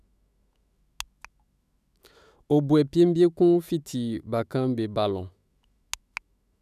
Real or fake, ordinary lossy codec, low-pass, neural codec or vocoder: fake; none; 14.4 kHz; autoencoder, 48 kHz, 128 numbers a frame, DAC-VAE, trained on Japanese speech